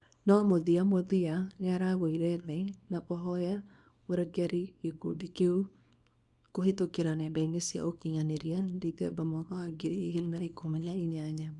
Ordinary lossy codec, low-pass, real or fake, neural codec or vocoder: none; 10.8 kHz; fake; codec, 24 kHz, 0.9 kbps, WavTokenizer, small release